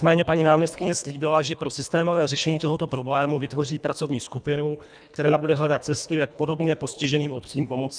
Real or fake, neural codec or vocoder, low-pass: fake; codec, 24 kHz, 1.5 kbps, HILCodec; 9.9 kHz